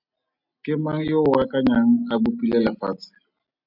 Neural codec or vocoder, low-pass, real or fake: none; 5.4 kHz; real